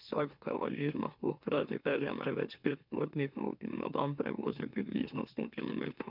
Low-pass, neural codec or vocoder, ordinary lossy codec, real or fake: 5.4 kHz; autoencoder, 44.1 kHz, a latent of 192 numbers a frame, MeloTTS; none; fake